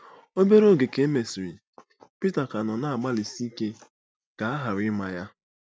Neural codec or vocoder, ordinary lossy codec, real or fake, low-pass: none; none; real; none